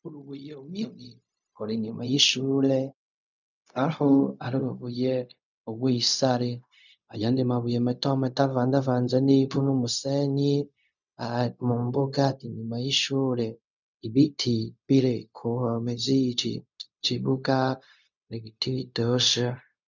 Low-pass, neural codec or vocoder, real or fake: 7.2 kHz; codec, 16 kHz, 0.4 kbps, LongCat-Audio-Codec; fake